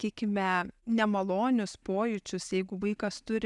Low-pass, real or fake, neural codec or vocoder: 10.8 kHz; real; none